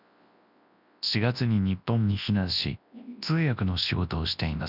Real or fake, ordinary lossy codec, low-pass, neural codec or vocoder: fake; none; 5.4 kHz; codec, 24 kHz, 0.9 kbps, WavTokenizer, large speech release